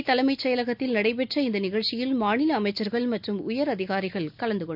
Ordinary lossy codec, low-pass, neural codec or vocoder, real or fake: none; 5.4 kHz; none; real